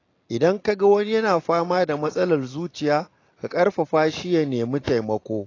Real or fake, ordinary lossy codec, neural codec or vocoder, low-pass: real; AAC, 32 kbps; none; 7.2 kHz